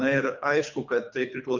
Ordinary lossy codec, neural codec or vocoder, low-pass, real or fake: MP3, 64 kbps; codec, 24 kHz, 3 kbps, HILCodec; 7.2 kHz; fake